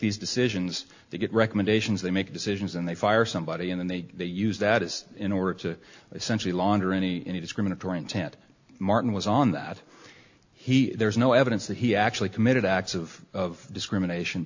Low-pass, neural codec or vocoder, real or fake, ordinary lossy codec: 7.2 kHz; none; real; AAC, 48 kbps